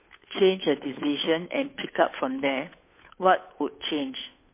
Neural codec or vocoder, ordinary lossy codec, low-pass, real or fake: codec, 16 kHz, 2 kbps, FunCodec, trained on Chinese and English, 25 frames a second; MP3, 24 kbps; 3.6 kHz; fake